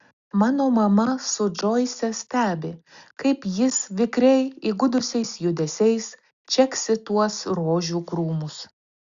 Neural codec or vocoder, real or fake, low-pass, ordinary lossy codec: none; real; 7.2 kHz; Opus, 64 kbps